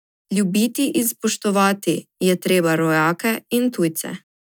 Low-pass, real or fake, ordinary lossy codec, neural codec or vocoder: none; real; none; none